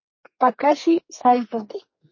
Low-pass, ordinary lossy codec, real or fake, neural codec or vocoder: 7.2 kHz; MP3, 32 kbps; fake; codec, 32 kHz, 1.9 kbps, SNAC